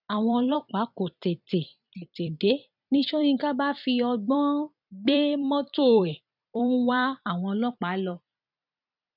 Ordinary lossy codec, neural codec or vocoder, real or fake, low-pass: none; vocoder, 44.1 kHz, 128 mel bands every 256 samples, BigVGAN v2; fake; 5.4 kHz